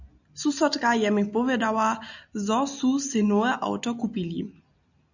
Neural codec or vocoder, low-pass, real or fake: none; 7.2 kHz; real